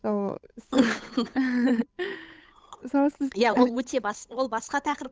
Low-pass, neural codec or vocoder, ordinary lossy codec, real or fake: none; codec, 16 kHz, 8 kbps, FunCodec, trained on Chinese and English, 25 frames a second; none; fake